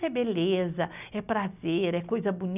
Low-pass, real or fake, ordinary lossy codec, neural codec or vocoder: 3.6 kHz; real; none; none